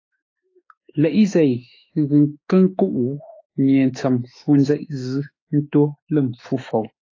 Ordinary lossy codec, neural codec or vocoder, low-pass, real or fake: AAC, 32 kbps; autoencoder, 48 kHz, 32 numbers a frame, DAC-VAE, trained on Japanese speech; 7.2 kHz; fake